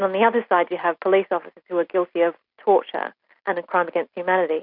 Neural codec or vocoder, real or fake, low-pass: none; real; 5.4 kHz